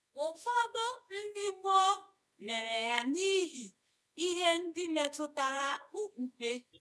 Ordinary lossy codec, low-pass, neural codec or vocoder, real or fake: none; none; codec, 24 kHz, 0.9 kbps, WavTokenizer, medium music audio release; fake